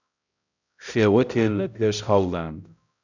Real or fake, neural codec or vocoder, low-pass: fake; codec, 16 kHz, 0.5 kbps, X-Codec, HuBERT features, trained on balanced general audio; 7.2 kHz